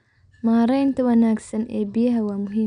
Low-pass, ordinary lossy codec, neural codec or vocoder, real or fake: 9.9 kHz; none; none; real